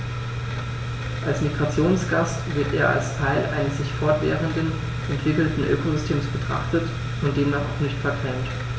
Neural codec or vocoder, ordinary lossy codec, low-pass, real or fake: none; none; none; real